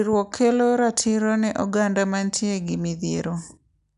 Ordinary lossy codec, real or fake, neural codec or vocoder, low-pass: none; real; none; 10.8 kHz